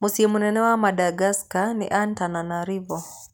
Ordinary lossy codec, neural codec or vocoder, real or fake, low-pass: none; none; real; none